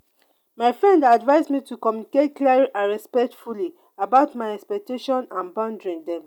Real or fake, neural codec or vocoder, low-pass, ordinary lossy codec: real; none; 19.8 kHz; none